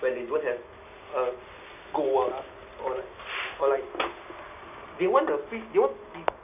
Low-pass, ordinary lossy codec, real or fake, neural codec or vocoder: 3.6 kHz; none; real; none